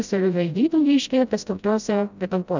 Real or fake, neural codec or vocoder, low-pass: fake; codec, 16 kHz, 0.5 kbps, FreqCodec, smaller model; 7.2 kHz